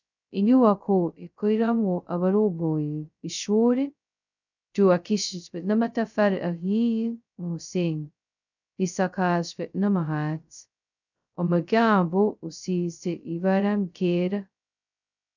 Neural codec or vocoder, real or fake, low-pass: codec, 16 kHz, 0.2 kbps, FocalCodec; fake; 7.2 kHz